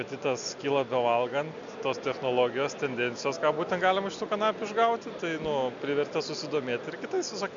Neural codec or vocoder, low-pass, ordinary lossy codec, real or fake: none; 7.2 kHz; MP3, 64 kbps; real